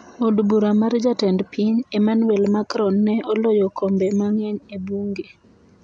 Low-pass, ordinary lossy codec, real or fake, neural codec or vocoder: 9.9 kHz; none; real; none